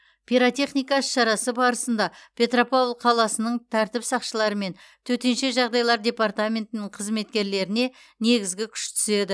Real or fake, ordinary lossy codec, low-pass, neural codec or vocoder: real; none; none; none